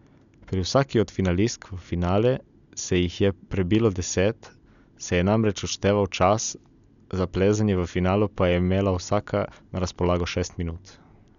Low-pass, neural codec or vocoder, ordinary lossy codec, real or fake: 7.2 kHz; none; none; real